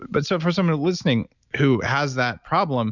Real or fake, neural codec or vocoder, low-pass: real; none; 7.2 kHz